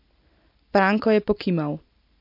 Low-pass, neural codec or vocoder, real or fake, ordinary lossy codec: 5.4 kHz; none; real; MP3, 32 kbps